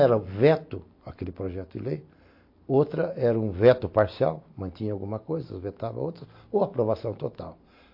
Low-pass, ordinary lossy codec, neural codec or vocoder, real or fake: 5.4 kHz; MP3, 32 kbps; none; real